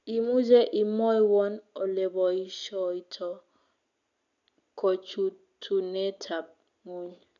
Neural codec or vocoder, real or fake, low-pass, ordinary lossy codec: none; real; 7.2 kHz; none